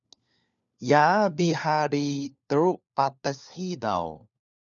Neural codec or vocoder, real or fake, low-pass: codec, 16 kHz, 4 kbps, FunCodec, trained on LibriTTS, 50 frames a second; fake; 7.2 kHz